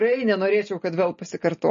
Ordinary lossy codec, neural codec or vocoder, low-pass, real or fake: MP3, 32 kbps; none; 7.2 kHz; real